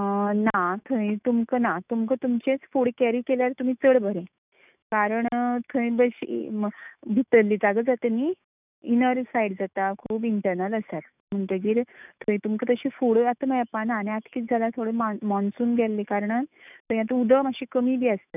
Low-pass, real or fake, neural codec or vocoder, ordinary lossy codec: 3.6 kHz; fake; autoencoder, 48 kHz, 128 numbers a frame, DAC-VAE, trained on Japanese speech; none